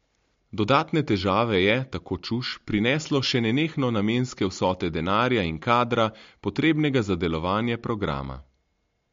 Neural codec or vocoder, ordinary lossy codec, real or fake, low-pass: none; MP3, 48 kbps; real; 7.2 kHz